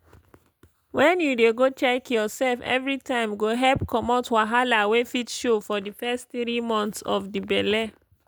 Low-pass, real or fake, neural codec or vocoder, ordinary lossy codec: none; real; none; none